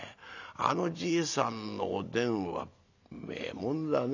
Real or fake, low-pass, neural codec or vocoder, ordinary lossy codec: real; 7.2 kHz; none; none